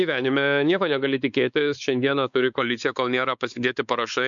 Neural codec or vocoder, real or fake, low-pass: codec, 16 kHz, 4 kbps, X-Codec, WavLM features, trained on Multilingual LibriSpeech; fake; 7.2 kHz